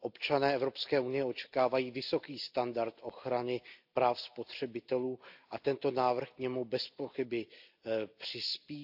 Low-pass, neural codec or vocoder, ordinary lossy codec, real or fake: 5.4 kHz; none; AAC, 48 kbps; real